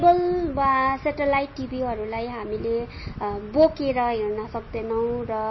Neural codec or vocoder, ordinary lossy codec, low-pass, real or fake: none; MP3, 24 kbps; 7.2 kHz; real